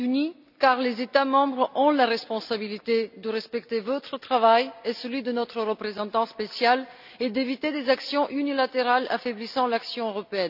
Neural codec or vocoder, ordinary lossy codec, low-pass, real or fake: none; none; 5.4 kHz; real